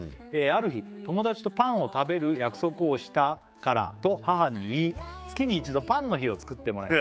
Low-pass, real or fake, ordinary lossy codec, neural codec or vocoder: none; fake; none; codec, 16 kHz, 4 kbps, X-Codec, HuBERT features, trained on general audio